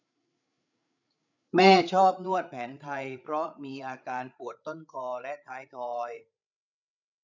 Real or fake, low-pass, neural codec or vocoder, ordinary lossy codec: fake; 7.2 kHz; codec, 16 kHz, 8 kbps, FreqCodec, larger model; none